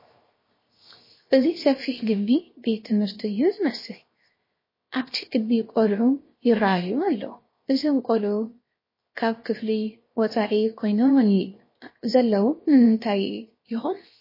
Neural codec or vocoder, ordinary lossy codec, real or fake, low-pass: codec, 16 kHz, 0.7 kbps, FocalCodec; MP3, 24 kbps; fake; 5.4 kHz